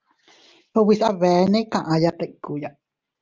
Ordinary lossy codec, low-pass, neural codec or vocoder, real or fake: Opus, 32 kbps; 7.2 kHz; none; real